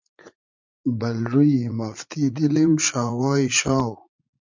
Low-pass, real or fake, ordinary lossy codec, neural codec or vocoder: 7.2 kHz; fake; MP3, 64 kbps; vocoder, 44.1 kHz, 80 mel bands, Vocos